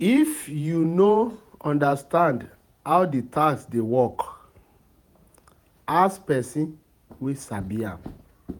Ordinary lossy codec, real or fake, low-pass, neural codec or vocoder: none; fake; none; vocoder, 48 kHz, 128 mel bands, Vocos